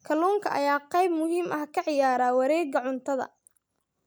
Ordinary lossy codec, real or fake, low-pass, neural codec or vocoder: none; real; none; none